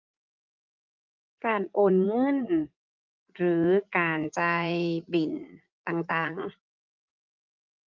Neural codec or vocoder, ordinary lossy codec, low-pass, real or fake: vocoder, 44.1 kHz, 80 mel bands, Vocos; Opus, 24 kbps; 7.2 kHz; fake